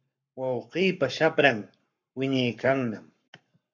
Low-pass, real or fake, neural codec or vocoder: 7.2 kHz; fake; codec, 44.1 kHz, 7.8 kbps, Pupu-Codec